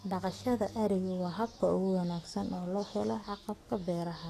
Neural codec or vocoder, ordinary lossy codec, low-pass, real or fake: codec, 44.1 kHz, 7.8 kbps, DAC; AAC, 48 kbps; 14.4 kHz; fake